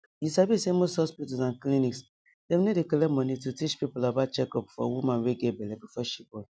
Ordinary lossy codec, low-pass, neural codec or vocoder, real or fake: none; none; none; real